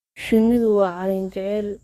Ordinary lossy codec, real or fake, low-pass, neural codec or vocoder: Opus, 64 kbps; fake; 14.4 kHz; codec, 32 kHz, 1.9 kbps, SNAC